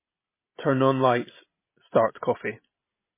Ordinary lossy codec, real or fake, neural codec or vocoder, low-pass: MP3, 16 kbps; real; none; 3.6 kHz